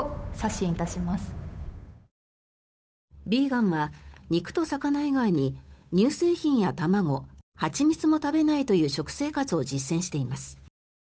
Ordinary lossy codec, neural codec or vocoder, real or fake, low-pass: none; codec, 16 kHz, 8 kbps, FunCodec, trained on Chinese and English, 25 frames a second; fake; none